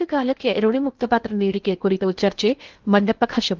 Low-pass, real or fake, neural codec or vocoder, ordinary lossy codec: 7.2 kHz; fake; codec, 16 kHz in and 24 kHz out, 0.6 kbps, FocalCodec, streaming, 2048 codes; Opus, 32 kbps